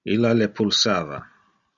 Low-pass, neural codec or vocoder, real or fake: 7.2 kHz; none; real